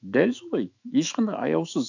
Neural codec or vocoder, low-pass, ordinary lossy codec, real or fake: autoencoder, 48 kHz, 128 numbers a frame, DAC-VAE, trained on Japanese speech; 7.2 kHz; none; fake